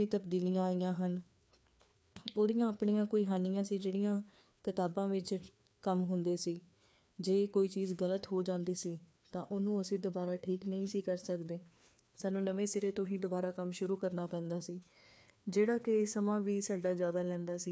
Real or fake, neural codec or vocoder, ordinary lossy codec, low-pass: fake; codec, 16 kHz, 2 kbps, FreqCodec, larger model; none; none